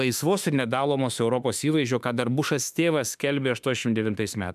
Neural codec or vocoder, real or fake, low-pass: autoencoder, 48 kHz, 32 numbers a frame, DAC-VAE, trained on Japanese speech; fake; 14.4 kHz